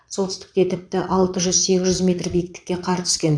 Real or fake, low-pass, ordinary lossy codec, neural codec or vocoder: fake; 9.9 kHz; none; vocoder, 22.05 kHz, 80 mel bands, Vocos